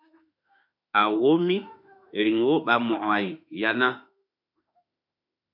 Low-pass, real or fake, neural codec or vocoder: 5.4 kHz; fake; autoencoder, 48 kHz, 32 numbers a frame, DAC-VAE, trained on Japanese speech